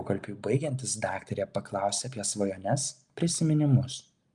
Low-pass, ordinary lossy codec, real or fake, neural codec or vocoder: 10.8 kHz; Opus, 32 kbps; real; none